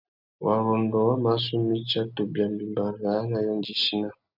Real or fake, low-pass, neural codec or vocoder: real; 5.4 kHz; none